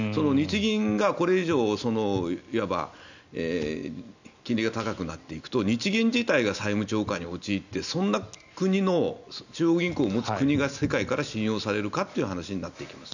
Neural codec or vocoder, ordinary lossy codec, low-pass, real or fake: none; none; 7.2 kHz; real